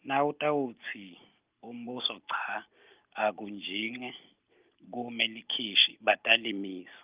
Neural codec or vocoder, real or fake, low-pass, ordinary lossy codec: none; real; 3.6 kHz; Opus, 32 kbps